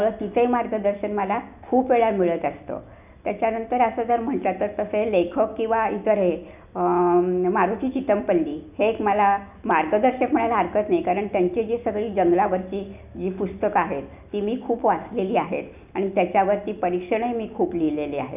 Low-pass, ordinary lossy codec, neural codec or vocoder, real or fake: 3.6 kHz; none; none; real